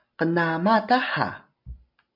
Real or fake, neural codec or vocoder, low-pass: real; none; 5.4 kHz